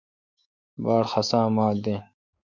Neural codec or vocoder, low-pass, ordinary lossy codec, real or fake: autoencoder, 48 kHz, 128 numbers a frame, DAC-VAE, trained on Japanese speech; 7.2 kHz; MP3, 48 kbps; fake